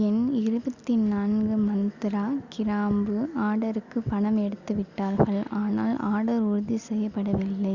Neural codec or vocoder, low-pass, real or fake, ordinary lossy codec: none; 7.2 kHz; real; Opus, 64 kbps